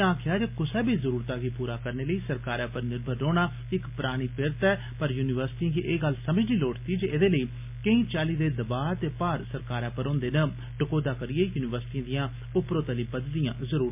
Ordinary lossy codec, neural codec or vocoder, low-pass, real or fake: MP3, 32 kbps; none; 3.6 kHz; real